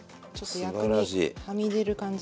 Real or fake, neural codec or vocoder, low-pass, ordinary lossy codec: real; none; none; none